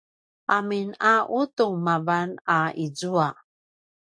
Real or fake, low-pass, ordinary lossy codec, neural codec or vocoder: real; 9.9 kHz; AAC, 64 kbps; none